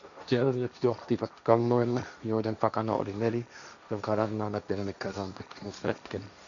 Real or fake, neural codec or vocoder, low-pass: fake; codec, 16 kHz, 1.1 kbps, Voila-Tokenizer; 7.2 kHz